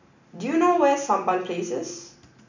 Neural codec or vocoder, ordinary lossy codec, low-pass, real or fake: none; none; 7.2 kHz; real